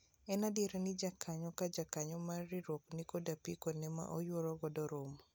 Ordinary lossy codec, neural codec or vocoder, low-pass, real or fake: none; none; none; real